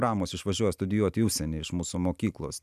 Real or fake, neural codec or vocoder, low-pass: real; none; 14.4 kHz